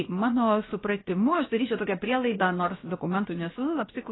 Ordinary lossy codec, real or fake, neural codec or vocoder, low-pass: AAC, 16 kbps; fake; codec, 16 kHz, about 1 kbps, DyCAST, with the encoder's durations; 7.2 kHz